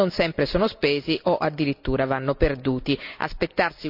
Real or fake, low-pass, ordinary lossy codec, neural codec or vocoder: real; 5.4 kHz; none; none